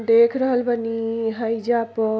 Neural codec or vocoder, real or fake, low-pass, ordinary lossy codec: none; real; none; none